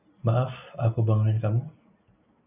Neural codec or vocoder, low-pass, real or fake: none; 3.6 kHz; real